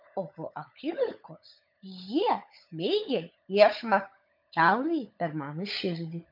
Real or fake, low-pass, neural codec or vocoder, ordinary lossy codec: fake; 5.4 kHz; codec, 16 kHz, 16 kbps, FunCodec, trained on LibriTTS, 50 frames a second; MP3, 48 kbps